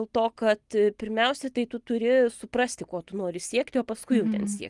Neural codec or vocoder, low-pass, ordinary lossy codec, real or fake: none; 10.8 kHz; Opus, 24 kbps; real